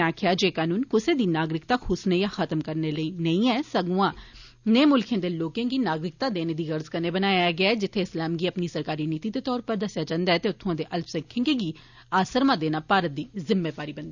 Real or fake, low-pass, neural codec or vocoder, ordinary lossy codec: real; 7.2 kHz; none; none